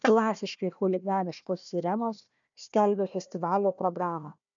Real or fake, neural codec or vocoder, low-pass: fake; codec, 16 kHz, 1 kbps, FunCodec, trained on Chinese and English, 50 frames a second; 7.2 kHz